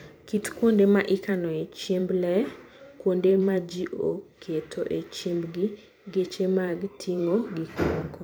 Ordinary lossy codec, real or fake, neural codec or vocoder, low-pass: none; fake; vocoder, 44.1 kHz, 128 mel bands every 512 samples, BigVGAN v2; none